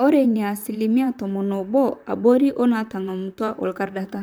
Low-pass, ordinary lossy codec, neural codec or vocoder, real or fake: none; none; vocoder, 44.1 kHz, 128 mel bands, Pupu-Vocoder; fake